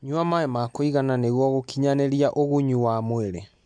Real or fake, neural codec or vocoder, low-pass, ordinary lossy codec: real; none; 9.9 kHz; MP3, 64 kbps